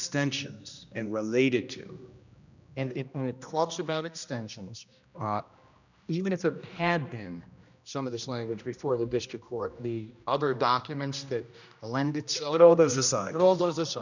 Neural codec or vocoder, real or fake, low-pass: codec, 16 kHz, 1 kbps, X-Codec, HuBERT features, trained on general audio; fake; 7.2 kHz